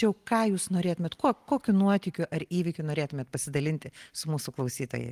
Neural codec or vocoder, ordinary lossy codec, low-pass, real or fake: none; Opus, 24 kbps; 14.4 kHz; real